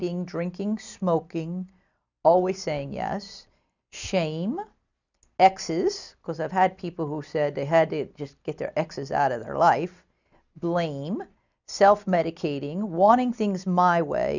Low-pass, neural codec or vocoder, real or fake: 7.2 kHz; none; real